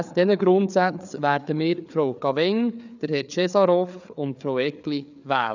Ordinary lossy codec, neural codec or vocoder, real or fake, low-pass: none; codec, 16 kHz, 4 kbps, FreqCodec, larger model; fake; 7.2 kHz